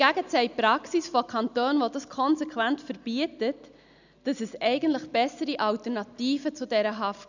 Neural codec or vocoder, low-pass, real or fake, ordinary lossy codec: none; 7.2 kHz; real; none